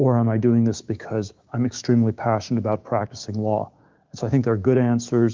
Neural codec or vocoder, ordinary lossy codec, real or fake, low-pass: codec, 16 kHz, 6 kbps, DAC; Opus, 32 kbps; fake; 7.2 kHz